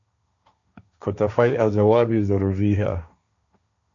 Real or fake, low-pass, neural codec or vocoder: fake; 7.2 kHz; codec, 16 kHz, 1.1 kbps, Voila-Tokenizer